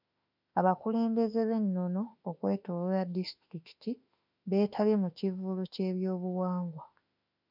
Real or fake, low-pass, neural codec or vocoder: fake; 5.4 kHz; autoencoder, 48 kHz, 32 numbers a frame, DAC-VAE, trained on Japanese speech